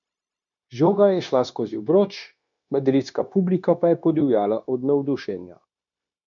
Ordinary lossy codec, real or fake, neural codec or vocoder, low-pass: none; fake; codec, 16 kHz, 0.9 kbps, LongCat-Audio-Codec; 7.2 kHz